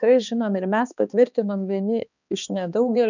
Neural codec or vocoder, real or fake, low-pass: codec, 16 kHz, 2 kbps, X-Codec, HuBERT features, trained on balanced general audio; fake; 7.2 kHz